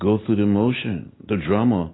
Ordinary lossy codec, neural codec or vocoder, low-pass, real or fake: AAC, 16 kbps; none; 7.2 kHz; real